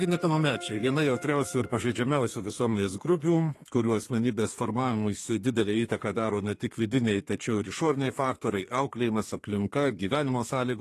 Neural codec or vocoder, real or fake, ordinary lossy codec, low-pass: codec, 32 kHz, 1.9 kbps, SNAC; fake; AAC, 48 kbps; 14.4 kHz